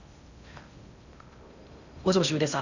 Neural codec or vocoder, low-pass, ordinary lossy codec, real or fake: codec, 16 kHz in and 24 kHz out, 0.6 kbps, FocalCodec, streaming, 2048 codes; 7.2 kHz; none; fake